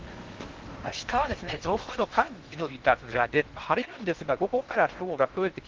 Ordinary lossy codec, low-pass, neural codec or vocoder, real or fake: Opus, 16 kbps; 7.2 kHz; codec, 16 kHz in and 24 kHz out, 0.6 kbps, FocalCodec, streaming, 4096 codes; fake